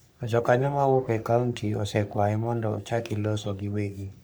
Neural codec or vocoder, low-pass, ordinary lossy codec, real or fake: codec, 44.1 kHz, 3.4 kbps, Pupu-Codec; none; none; fake